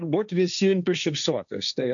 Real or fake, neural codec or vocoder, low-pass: fake; codec, 16 kHz, 1.1 kbps, Voila-Tokenizer; 7.2 kHz